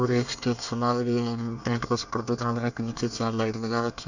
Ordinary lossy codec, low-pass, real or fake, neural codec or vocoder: none; 7.2 kHz; fake; codec, 24 kHz, 1 kbps, SNAC